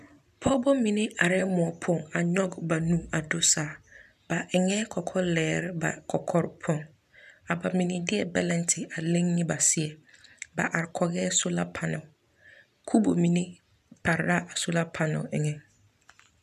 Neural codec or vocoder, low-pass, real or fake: none; 14.4 kHz; real